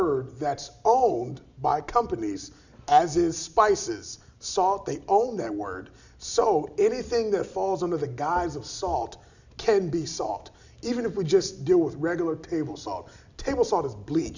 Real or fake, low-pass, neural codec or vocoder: real; 7.2 kHz; none